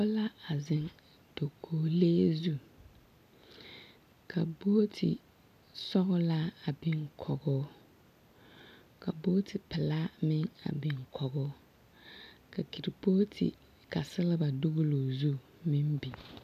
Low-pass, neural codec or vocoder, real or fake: 14.4 kHz; none; real